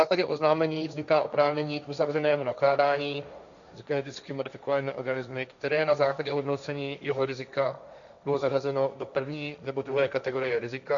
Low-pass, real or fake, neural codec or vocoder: 7.2 kHz; fake; codec, 16 kHz, 1.1 kbps, Voila-Tokenizer